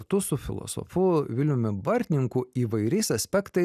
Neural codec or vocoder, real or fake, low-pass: none; real; 14.4 kHz